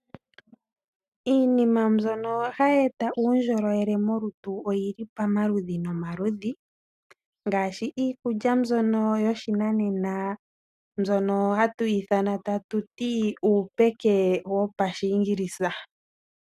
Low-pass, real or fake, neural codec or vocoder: 14.4 kHz; real; none